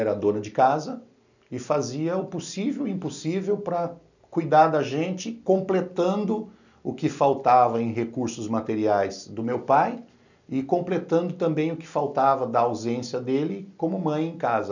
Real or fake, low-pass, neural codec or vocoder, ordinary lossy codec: real; 7.2 kHz; none; none